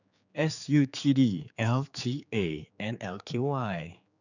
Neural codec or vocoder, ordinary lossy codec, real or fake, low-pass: codec, 16 kHz, 4 kbps, X-Codec, HuBERT features, trained on general audio; none; fake; 7.2 kHz